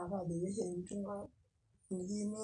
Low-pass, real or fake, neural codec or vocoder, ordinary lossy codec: 9.9 kHz; real; none; none